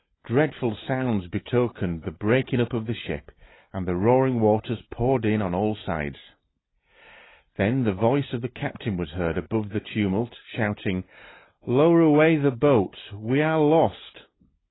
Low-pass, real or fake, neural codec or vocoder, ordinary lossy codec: 7.2 kHz; real; none; AAC, 16 kbps